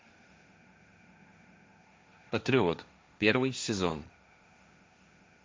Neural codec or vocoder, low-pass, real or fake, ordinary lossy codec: codec, 16 kHz, 1.1 kbps, Voila-Tokenizer; none; fake; none